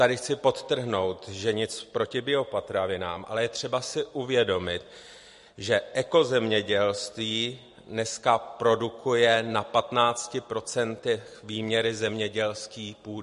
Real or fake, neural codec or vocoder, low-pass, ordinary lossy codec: real; none; 14.4 kHz; MP3, 48 kbps